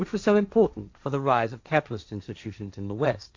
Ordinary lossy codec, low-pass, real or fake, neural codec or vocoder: AAC, 48 kbps; 7.2 kHz; fake; codec, 16 kHz, 1.1 kbps, Voila-Tokenizer